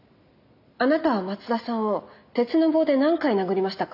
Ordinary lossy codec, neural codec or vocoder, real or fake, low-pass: none; none; real; 5.4 kHz